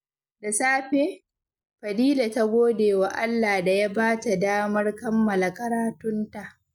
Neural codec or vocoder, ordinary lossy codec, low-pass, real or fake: none; none; 19.8 kHz; real